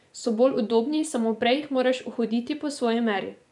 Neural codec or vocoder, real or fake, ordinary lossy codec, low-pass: vocoder, 24 kHz, 100 mel bands, Vocos; fake; none; 10.8 kHz